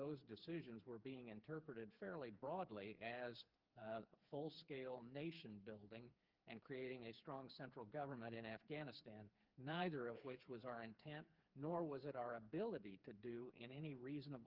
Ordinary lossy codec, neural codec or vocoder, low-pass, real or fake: Opus, 24 kbps; codec, 16 kHz, 4 kbps, FreqCodec, smaller model; 5.4 kHz; fake